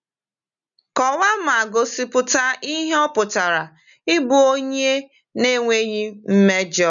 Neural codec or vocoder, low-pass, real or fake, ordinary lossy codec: none; 7.2 kHz; real; none